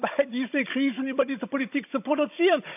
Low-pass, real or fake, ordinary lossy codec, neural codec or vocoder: 3.6 kHz; fake; AAC, 32 kbps; codec, 16 kHz, 16 kbps, FunCodec, trained on Chinese and English, 50 frames a second